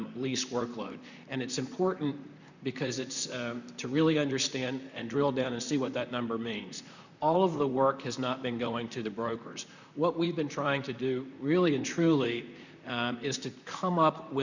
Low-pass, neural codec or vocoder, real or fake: 7.2 kHz; vocoder, 44.1 kHz, 128 mel bands, Pupu-Vocoder; fake